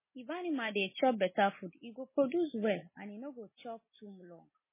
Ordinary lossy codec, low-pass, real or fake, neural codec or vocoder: MP3, 16 kbps; 3.6 kHz; real; none